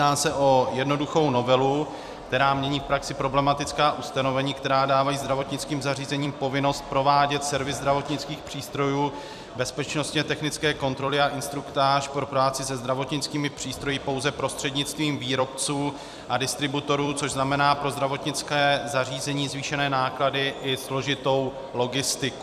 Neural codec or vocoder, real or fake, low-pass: vocoder, 44.1 kHz, 128 mel bands every 256 samples, BigVGAN v2; fake; 14.4 kHz